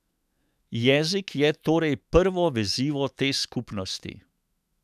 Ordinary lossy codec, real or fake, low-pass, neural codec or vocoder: none; fake; 14.4 kHz; autoencoder, 48 kHz, 128 numbers a frame, DAC-VAE, trained on Japanese speech